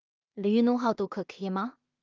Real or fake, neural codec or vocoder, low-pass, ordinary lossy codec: fake; codec, 16 kHz in and 24 kHz out, 0.4 kbps, LongCat-Audio-Codec, two codebook decoder; 7.2 kHz; Opus, 24 kbps